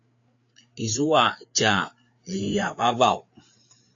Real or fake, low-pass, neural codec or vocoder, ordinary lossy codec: fake; 7.2 kHz; codec, 16 kHz, 4 kbps, FreqCodec, larger model; AAC, 48 kbps